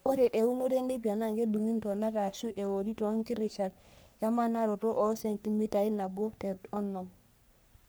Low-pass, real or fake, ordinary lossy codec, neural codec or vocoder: none; fake; none; codec, 44.1 kHz, 3.4 kbps, Pupu-Codec